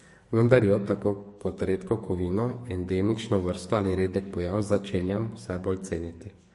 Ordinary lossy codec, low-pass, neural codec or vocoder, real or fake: MP3, 48 kbps; 14.4 kHz; codec, 44.1 kHz, 2.6 kbps, SNAC; fake